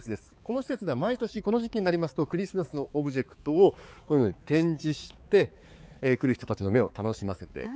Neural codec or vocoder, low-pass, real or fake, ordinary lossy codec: codec, 16 kHz, 4 kbps, X-Codec, HuBERT features, trained on balanced general audio; none; fake; none